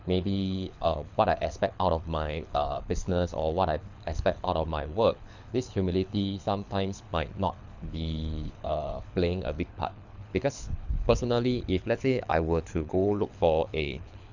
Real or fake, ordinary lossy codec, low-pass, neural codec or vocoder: fake; none; 7.2 kHz; codec, 24 kHz, 6 kbps, HILCodec